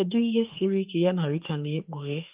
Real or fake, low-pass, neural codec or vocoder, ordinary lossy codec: fake; 3.6 kHz; autoencoder, 48 kHz, 32 numbers a frame, DAC-VAE, trained on Japanese speech; Opus, 32 kbps